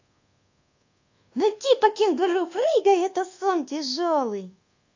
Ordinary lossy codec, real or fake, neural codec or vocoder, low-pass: AAC, 48 kbps; fake; codec, 24 kHz, 1.2 kbps, DualCodec; 7.2 kHz